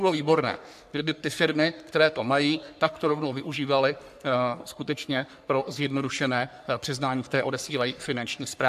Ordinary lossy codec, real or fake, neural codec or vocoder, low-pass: AAC, 96 kbps; fake; codec, 44.1 kHz, 3.4 kbps, Pupu-Codec; 14.4 kHz